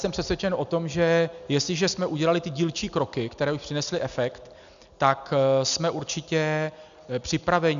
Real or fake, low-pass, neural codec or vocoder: real; 7.2 kHz; none